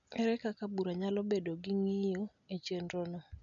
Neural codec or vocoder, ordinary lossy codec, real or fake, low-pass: none; none; real; 7.2 kHz